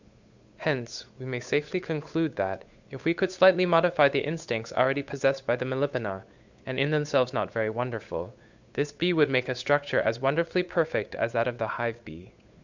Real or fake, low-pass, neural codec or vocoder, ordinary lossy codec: fake; 7.2 kHz; codec, 16 kHz, 8 kbps, FunCodec, trained on Chinese and English, 25 frames a second; Opus, 64 kbps